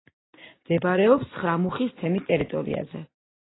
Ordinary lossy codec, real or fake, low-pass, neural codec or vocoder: AAC, 16 kbps; real; 7.2 kHz; none